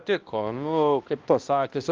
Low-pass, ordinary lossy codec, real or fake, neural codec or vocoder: 7.2 kHz; Opus, 32 kbps; fake; codec, 16 kHz, 1 kbps, X-Codec, HuBERT features, trained on balanced general audio